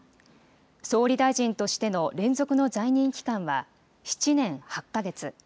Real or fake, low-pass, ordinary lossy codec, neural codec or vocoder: real; none; none; none